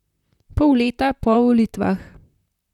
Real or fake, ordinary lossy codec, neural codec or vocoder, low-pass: fake; none; vocoder, 44.1 kHz, 128 mel bands, Pupu-Vocoder; 19.8 kHz